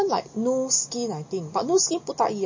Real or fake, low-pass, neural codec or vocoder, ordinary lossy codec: real; 7.2 kHz; none; MP3, 32 kbps